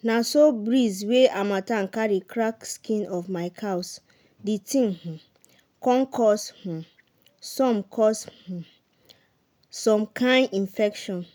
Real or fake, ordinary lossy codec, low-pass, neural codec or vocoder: real; none; none; none